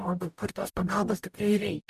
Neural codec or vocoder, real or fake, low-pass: codec, 44.1 kHz, 0.9 kbps, DAC; fake; 14.4 kHz